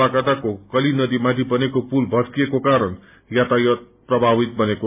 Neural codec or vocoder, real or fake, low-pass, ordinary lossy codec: none; real; 3.6 kHz; MP3, 32 kbps